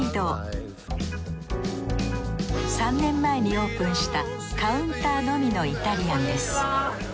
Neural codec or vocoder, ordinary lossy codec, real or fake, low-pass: none; none; real; none